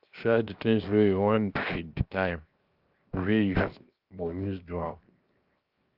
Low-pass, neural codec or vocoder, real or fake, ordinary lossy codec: 5.4 kHz; codec, 16 kHz, 0.7 kbps, FocalCodec; fake; Opus, 24 kbps